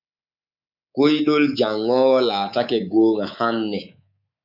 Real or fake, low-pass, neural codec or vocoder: fake; 5.4 kHz; codec, 24 kHz, 3.1 kbps, DualCodec